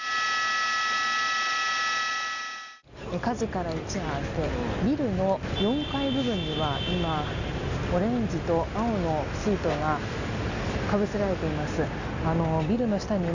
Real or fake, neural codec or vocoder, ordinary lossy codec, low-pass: real; none; none; 7.2 kHz